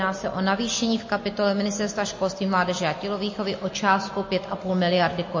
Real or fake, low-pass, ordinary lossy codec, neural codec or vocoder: real; 7.2 kHz; MP3, 32 kbps; none